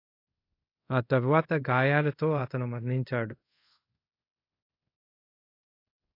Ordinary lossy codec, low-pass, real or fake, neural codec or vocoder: AAC, 32 kbps; 5.4 kHz; fake; codec, 24 kHz, 0.5 kbps, DualCodec